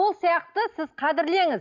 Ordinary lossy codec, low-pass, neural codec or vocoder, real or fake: none; 7.2 kHz; none; real